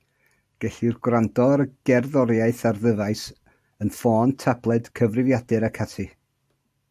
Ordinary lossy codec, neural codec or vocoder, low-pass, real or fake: AAC, 96 kbps; none; 14.4 kHz; real